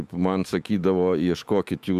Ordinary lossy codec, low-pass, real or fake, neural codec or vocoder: Opus, 64 kbps; 14.4 kHz; fake; autoencoder, 48 kHz, 128 numbers a frame, DAC-VAE, trained on Japanese speech